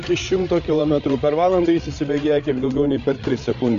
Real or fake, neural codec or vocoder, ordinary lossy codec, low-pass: fake; codec, 16 kHz, 8 kbps, FreqCodec, larger model; MP3, 48 kbps; 7.2 kHz